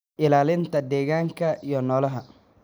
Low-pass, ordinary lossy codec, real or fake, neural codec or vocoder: none; none; real; none